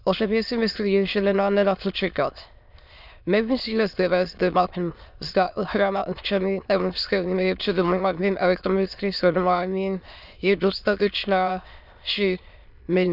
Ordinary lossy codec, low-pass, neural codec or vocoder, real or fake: none; 5.4 kHz; autoencoder, 22.05 kHz, a latent of 192 numbers a frame, VITS, trained on many speakers; fake